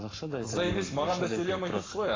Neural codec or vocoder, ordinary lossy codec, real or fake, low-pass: codec, 44.1 kHz, 7.8 kbps, Pupu-Codec; AAC, 32 kbps; fake; 7.2 kHz